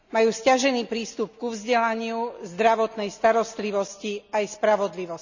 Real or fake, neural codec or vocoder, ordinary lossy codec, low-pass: real; none; none; 7.2 kHz